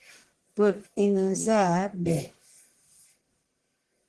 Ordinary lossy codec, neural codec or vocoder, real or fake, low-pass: Opus, 16 kbps; codec, 44.1 kHz, 1.7 kbps, Pupu-Codec; fake; 10.8 kHz